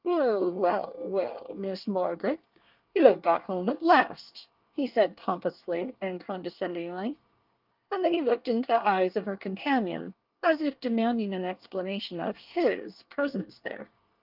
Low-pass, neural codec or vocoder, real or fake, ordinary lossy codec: 5.4 kHz; codec, 24 kHz, 1 kbps, SNAC; fake; Opus, 24 kbps